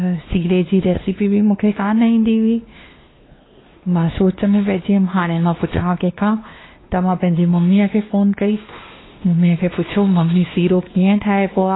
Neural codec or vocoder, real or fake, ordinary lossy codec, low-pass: codec, 16 kHz, 2 kbps, X-Codec, WavLM features, trained on Multilingual LibriSpeech; fake; AAC, 16 kbps; 7.2 kHz